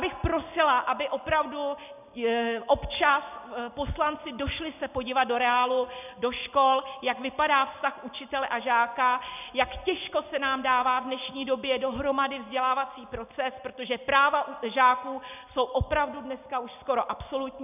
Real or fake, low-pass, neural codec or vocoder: real; 3.6 kHz; none